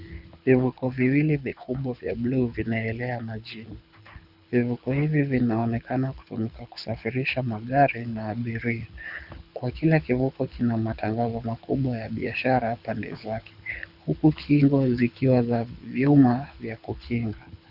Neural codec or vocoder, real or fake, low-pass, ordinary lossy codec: codec, 24 kHz, 6 kbps, HILCodec; fake; 5.4 kHz; Opus, 64 kbps